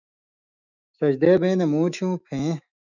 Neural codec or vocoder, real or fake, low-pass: autoencoder, 48 kHz, 128 numbers a frame, DAC-VAE, trained on Japanese speech; fake; 7.2 kHz